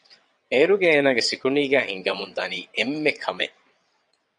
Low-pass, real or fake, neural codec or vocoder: 9.9 kHz; fake; vocoder, 22.05 kHz, 80 mel bands, WaveNeXt